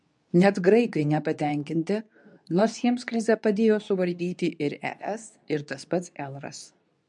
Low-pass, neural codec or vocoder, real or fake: 10.8 kHz; codec, 24 kHz, 0.9 kbps, WavTokenizer, medium speech release version 2; fake